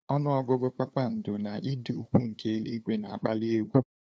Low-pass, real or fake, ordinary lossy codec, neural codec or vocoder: none; fake; none; codec, 16 kHz, 2 kbps, FunCodec, trained on LibriTTS, 25 frames a second